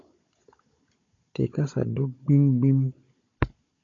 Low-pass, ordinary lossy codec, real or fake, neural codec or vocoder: 7.2 kHz; AAC, 48 kbps; fake; codec, 16 kHz, 16 kbps, FunCodec, trained on Chinese and English, 50 frames a second